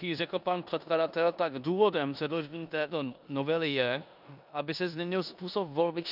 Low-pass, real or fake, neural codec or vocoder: 5.4 kHz; fake; codec, 16 kHz in and 24 kHz out, 0.9 kbps, LongCat-Audio-Codec, four codebook decoder